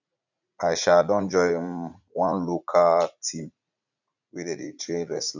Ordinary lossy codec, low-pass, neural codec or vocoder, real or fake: none; 7.2 kHz; vocoder, 44.1 kHz, 80 mel bands, Vocos; fake